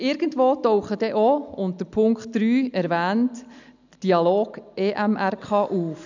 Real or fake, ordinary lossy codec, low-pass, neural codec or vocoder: real; none; 7.2 kHz; none